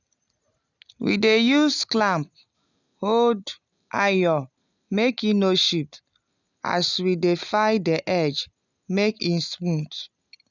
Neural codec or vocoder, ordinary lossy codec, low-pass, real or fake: none; none; 7.2 kHz; real